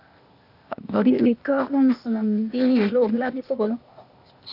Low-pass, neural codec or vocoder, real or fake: 5.4 kHz; codec, 16 kHz, 0.8 kbps, ZipCodec; fake